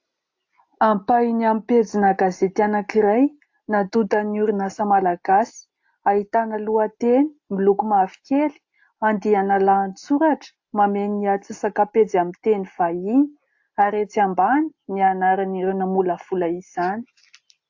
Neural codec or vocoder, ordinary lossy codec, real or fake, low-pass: none; AAC, 48 kbps; real; 7.2 kHz